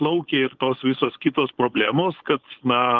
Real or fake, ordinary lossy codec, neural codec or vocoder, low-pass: fake; Opus, 16 kbps; codec, 16 kHz, 4.8 kbps, FACodec; 7.2 kHz